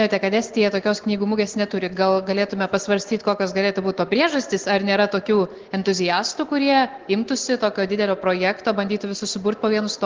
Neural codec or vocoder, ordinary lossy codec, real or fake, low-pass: none; Opus, 16 kbps; real; 7.2 kHz